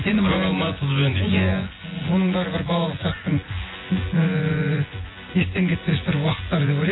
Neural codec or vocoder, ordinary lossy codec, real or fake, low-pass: vocoder, 24 kHz, 100 mel bands, Vocos; AAC, 16 kbps; fake; 7.2 kHz